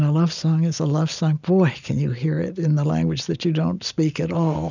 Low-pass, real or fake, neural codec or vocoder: 7.2 kHz; real; none